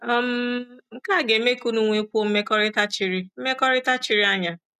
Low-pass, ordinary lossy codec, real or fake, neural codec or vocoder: 14.4 kHz; none; real; none